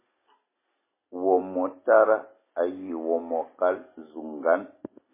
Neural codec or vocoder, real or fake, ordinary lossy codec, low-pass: none; real; MP3, 16 kbps; 3.6 kHz